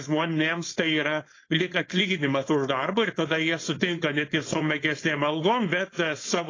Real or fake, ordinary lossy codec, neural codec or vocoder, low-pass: fake; AAC, 32 kbps; codec, 16 kHz, 4.8 kbps, FACodec; 7.2 kHz